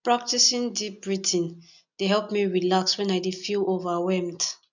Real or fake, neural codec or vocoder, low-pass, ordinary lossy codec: real; none; 7.2 kHz; none